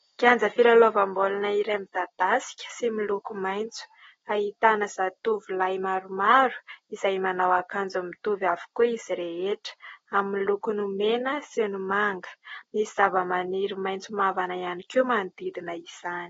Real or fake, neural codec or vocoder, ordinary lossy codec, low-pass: real; none; AAC, 24 kbps; 7.2 kHz